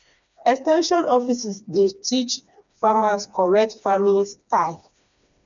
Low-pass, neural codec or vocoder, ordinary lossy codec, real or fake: 7.2 kHz; codec, 16 kHz, 2 kbps, FreqCodec, smaller model; none; fake